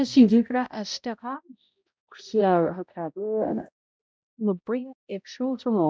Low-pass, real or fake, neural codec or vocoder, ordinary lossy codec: none; fake; codec, 16 kHz, 0.5 kbps, X-Codec, HuBERT features, trained on balanced general audio; none